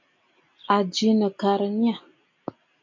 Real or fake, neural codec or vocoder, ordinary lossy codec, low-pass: real; none; MP3, 48 kbps; 7.2 kHz